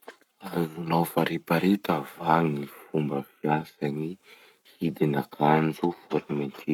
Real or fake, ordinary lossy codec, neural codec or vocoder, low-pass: fake; none; codec, 44.1 kHz, 7.8 kbps, Pupu-Codec; 19.8 kHz